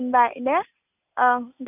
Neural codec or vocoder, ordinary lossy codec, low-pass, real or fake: none; none; 3.6 kHz; real